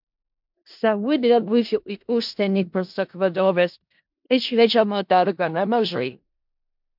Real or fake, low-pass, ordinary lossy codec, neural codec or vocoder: fake; 5.4 kHz; MP3, 48 kbps; codec, 16 kHz in and 24 kHz out, 0.4 kbps, LongCat-Audio-Codec, four codebook decoder